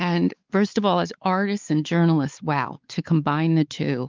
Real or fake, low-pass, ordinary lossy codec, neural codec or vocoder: fake; 7.2 kHz; Opus, 32 kbps; codec, 16 kHz, 4 kbps, X-Codec, WavLM features, trained on Multilingual LibriSpeech